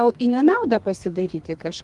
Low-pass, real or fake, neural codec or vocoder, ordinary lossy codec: 10.8 kHz; fake; codec, 32 kHz, 1.9 kbps, SNAC; Opus, 24 kbps